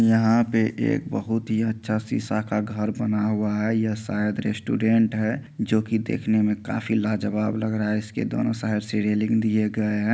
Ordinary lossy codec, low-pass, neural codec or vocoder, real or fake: none; none; none; real